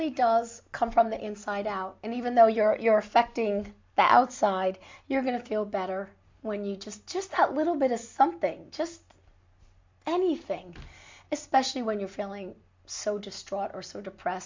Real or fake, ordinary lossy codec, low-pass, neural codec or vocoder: real; MP3, 48 kbps; 7.2 kHz; none